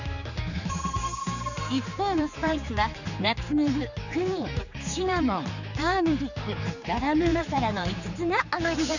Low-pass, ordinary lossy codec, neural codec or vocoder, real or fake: 7.2 kHz; none; codec, 16 kHz, 4 kbps, X-Codec, HuBERT features, trained on general audio; fake